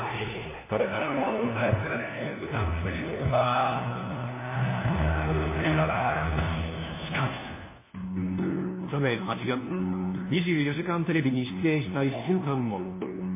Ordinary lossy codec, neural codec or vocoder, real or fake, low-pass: MP3, 16 kbps; codec, 16 kHz, 1 kbps, FunCodec, trained on LibriTTS, 50 frames a second; fake; 3.6 kHz